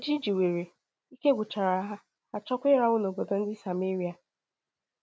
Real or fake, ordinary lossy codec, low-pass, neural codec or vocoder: real; none; none; none